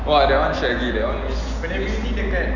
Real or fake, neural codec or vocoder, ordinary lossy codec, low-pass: real; none; none; 7.2 kHz